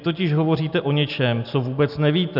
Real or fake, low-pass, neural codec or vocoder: real; 5.4 kHz; none